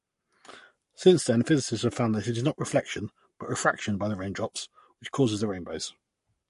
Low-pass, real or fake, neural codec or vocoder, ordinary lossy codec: 14.4 kHz; fake; codec, 44.1 kHz, 7.8 kbps, Pupu-Codec; MP3, 48 kbps